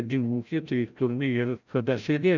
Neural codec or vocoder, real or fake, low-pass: codec, 16 kHz, 0.5 kbps, FreqCodec, larger model; fake; 7.2 kHz